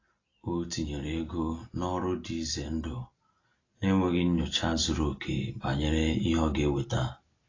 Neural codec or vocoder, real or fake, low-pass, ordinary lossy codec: none; real; 7.2 kHz; AAC, 32 kbps